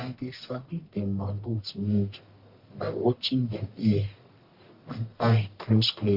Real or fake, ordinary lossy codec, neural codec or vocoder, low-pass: fake; Opus, 64 kbps; codec, 44.1 kHz, 1.7 kbps, Pupu-Codec; 5.4 kHz